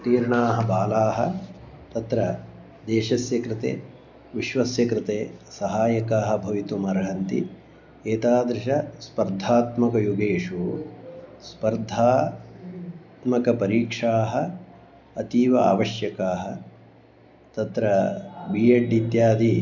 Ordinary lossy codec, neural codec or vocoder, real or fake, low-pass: none; none; real; 7.2 kHz